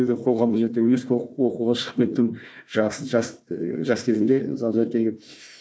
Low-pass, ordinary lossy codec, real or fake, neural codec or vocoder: none; none; fake; codec, 16 kHz, 1 kbps, FunCodec, trained on Chinese and English, 50 frames a second